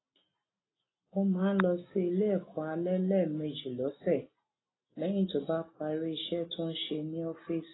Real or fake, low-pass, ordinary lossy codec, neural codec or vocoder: real; 7.2 kHz; AAC, 16 kbps; none